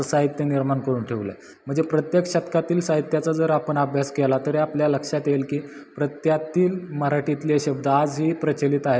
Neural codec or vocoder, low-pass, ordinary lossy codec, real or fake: none; none; none; real